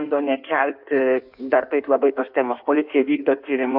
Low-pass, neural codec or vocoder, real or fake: 5.4 kHz; codec, 16 kHz in and 24 kHz out, 1.1 kbps, FireRedTTS-2 codec; fake